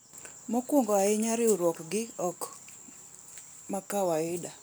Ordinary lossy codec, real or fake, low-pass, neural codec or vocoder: none; real; none; none